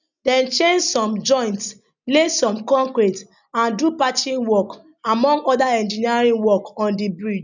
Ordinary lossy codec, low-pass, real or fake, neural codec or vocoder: none; 7.2 kHz; real; none